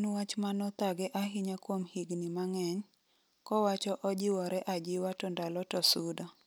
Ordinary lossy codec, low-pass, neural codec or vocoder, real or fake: none; none; none; real